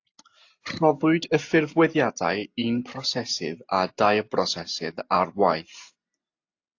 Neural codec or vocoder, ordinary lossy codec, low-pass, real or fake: none; AAC, 48 kbps; 7.2 kHz; real